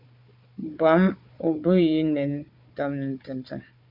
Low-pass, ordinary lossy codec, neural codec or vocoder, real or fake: 5.4 kHz; MP3, 48 kbps; codec, 16 kHz, 4 kbps, FunCodec, trained on Chinese and English, 50 frames a second; fake